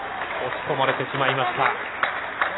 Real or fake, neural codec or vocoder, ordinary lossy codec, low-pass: real; none; AAC, 16 kbps; 7.2 kHz